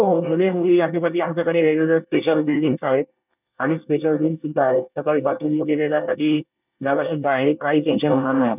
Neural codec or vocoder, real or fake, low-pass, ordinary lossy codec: codec, 24 kHz, 1 kbps, SNAC; fake; 3.6 kHz; none